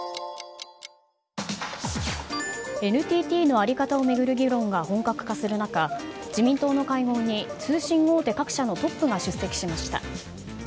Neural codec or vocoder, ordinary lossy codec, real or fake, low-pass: none; none; real; none